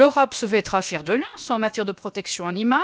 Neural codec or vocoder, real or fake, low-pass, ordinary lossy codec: codec, 16 kHz, about 1 kbps, DyCAST, with the encoder's durations; fake; none; none